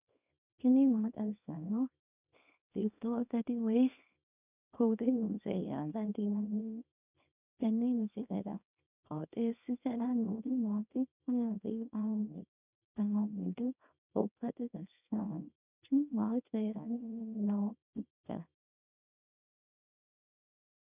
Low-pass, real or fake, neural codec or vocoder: 3.6 kHz; fake; codec, 24 kHz, 0.9 kbps, WavTokenizer, small release